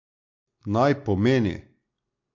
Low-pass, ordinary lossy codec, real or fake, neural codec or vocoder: 7.2 kHz; MP3, 48 kbps; real; none